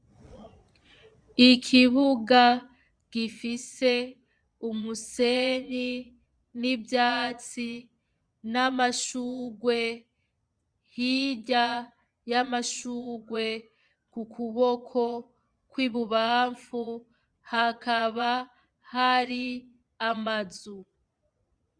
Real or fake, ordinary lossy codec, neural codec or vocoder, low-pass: fake; Opus, 64 kbps; vocoder, 22.05 kHz, 80 mel bands, Vocos; 9.9 kHz